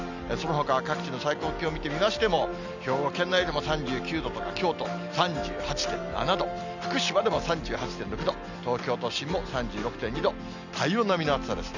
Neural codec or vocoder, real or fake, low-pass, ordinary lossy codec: none; real; 7.2 kHz; none